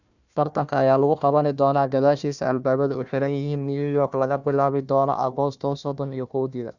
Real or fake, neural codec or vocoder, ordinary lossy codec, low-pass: fake; codec, 16 kHz, 1 kbps, FunCodec, trained on Chinese and English, 50 frames a second; none; 7.2 kHz